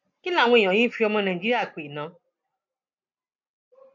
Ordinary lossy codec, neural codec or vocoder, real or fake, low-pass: MP3, 48 kbps; none; real; 7.2 kHz